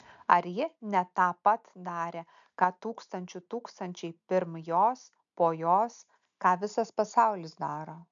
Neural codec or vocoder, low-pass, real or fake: none; 7.2 kHz; real